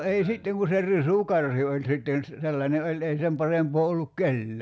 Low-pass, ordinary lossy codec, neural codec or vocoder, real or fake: none; none; none; real